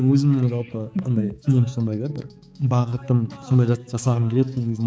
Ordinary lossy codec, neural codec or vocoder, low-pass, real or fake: none; codec, 16 kHz, 4 kbps, X-Codec, HuBERT features, trained on balanced general audio; none; fake